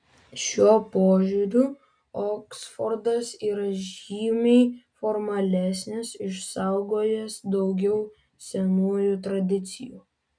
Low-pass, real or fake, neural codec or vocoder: 9.9 kHz; real; none